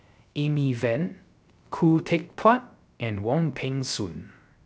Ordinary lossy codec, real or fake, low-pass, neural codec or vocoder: none; fake; none; codec, 16 kHz, 0.3 kbps, FocalCodec